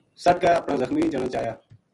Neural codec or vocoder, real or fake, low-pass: none; real; 10.8 kHz